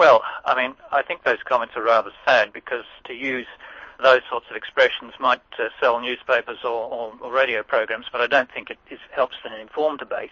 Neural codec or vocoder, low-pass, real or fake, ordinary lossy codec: codec, 24 kHz, 6 kbps, HILCodec; 7.2 kHz; fake; MP3, 32 kbps